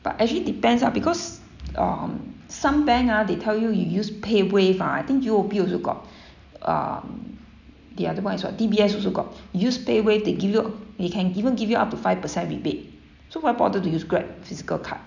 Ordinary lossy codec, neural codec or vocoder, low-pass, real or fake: none; none; 7.2 kHz; real